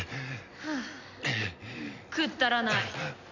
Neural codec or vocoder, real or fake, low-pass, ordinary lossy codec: none; real; 7.2 kHz; none